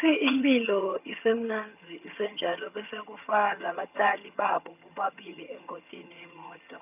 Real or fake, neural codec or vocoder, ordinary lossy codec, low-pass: fake; vocoder, 22.05 kHz, 80 mel bands, HiFi-GAN; none; 3.6 kHz